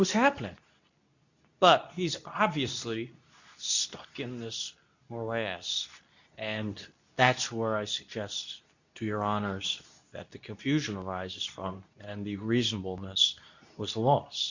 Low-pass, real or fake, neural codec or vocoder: 7.2 kHz; fake; codec, 24 kHz, 0.9 kbps, WavTokenizer, medium speech release version 2